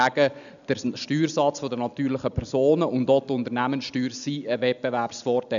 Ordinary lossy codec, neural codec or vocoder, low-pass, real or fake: none; none; 7.2 kHz; real